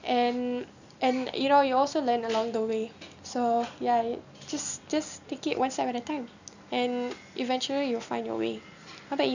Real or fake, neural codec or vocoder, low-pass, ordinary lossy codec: real; none; 7.2 kHz; none